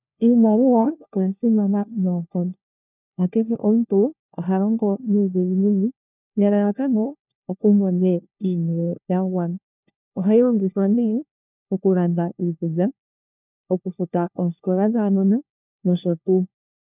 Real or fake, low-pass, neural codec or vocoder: fake; 3.6 kHz; codec, 16 kHz, 1 kbps, FunCodec, trained on LibriTTS, 50 frames a second